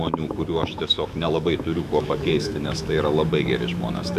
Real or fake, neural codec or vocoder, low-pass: fake; autoencoder, 48 kHz, 128 numbers a frame, DAC-VAE, trained on Japanese speech; 14.4 kHz